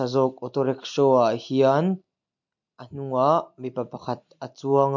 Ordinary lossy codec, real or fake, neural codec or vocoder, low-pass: MP3, 48 kbps; real; none; 7.2 kHz